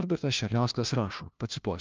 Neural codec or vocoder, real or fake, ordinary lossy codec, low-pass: codec, 16 kHz, 1 kbps, FunCodec, trained on LibriTTS, 50 frames a second; fake; Opus, 24 kbps; 7.2 kHz